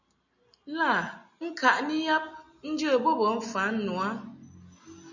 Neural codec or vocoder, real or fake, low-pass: none; real; 7.2 kHz